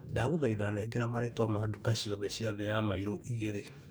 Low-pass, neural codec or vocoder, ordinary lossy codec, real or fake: none; codec, 44.1 kHz, 2.6 kbps, DAC; none; fake